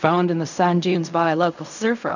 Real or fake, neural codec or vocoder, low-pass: fake; codec, 16 kHz in and 24 kHz out, 0.4 kbps, LongCat-Audio-Codec, fine tuned four codebook decoder; 7.2 kHz